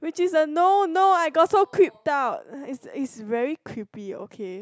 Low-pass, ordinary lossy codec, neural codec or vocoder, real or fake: none; none; none; real